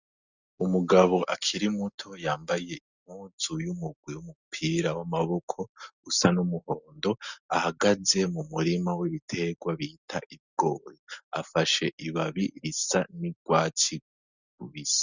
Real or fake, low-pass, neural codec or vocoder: real; 7.2 kHz; none